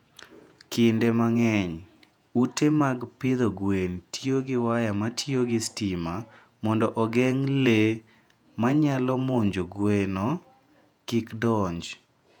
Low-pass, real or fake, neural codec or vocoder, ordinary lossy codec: 19.8 kHz; fake; vocoder, 48 kHz, 128 mel bands, Vocos; none